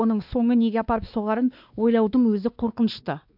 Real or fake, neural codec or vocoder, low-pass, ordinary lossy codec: fake; codec, 16 kHz, 2 kbps, X-Codec, WavLM features, trained on Multilingual LibriSpeech; 5.4 kHz; AAC, 48 kbps